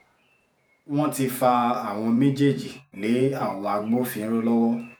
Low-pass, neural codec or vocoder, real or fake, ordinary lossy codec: none; vocoder, 48 kHz, 128 mel bands, Vocos; fake; none